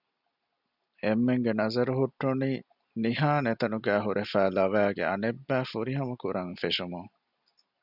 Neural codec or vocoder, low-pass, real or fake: none; 5.4 kHz; real